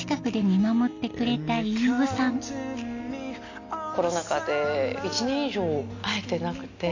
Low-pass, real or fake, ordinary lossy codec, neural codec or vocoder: 7.2 kHz; real; AAC, 48 kbps; none